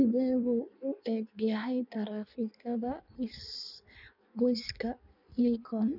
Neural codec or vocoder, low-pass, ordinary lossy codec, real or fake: codec, 16 kHz in and 24 kHz out, 1.1 kbps, FireRedTTS-2 codec; 5.4 kHz; none; fake